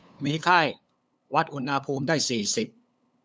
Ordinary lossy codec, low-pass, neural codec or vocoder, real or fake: none; none; codec, 16 kHz, 8 kbps, FunCodec, trained on LibriTTS, 25 frames a second; fake